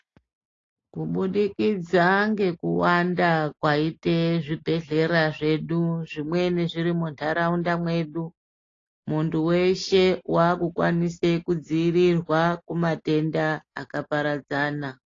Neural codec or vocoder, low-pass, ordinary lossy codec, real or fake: none; 7.2 kHz; AAC, 32 kbps; real